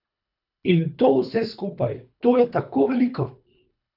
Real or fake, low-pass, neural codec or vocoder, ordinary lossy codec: fake; 5.4 kHz; codec, 24 kHz, 3 kbps, HILCodec; none